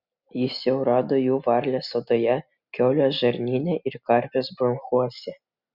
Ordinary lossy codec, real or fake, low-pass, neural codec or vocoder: Opus, 64 kbps; real; 5.4 kHz; none